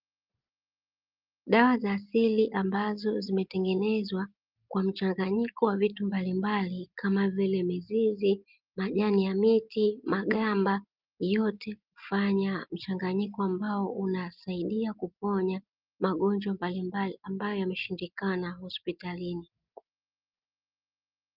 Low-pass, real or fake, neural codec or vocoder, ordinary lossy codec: 5.4 kHz; real; none; Opus, 24 kbps